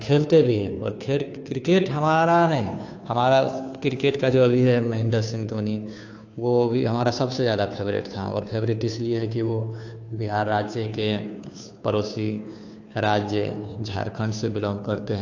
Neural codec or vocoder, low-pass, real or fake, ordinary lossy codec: codec, 16 kHz, 2 kbps, FunCodec, trained on Chinese and English, 25 frames a second; 7.2 kHz; fake; AAC, 48 kbps